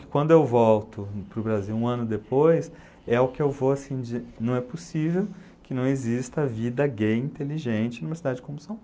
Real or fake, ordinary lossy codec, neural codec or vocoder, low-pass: real; none; none; none